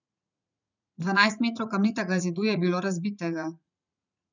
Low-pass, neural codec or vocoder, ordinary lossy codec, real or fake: 7.2 kHz; vocoder, 44.1 kHz, 80 mel bands, Vocos; none; fake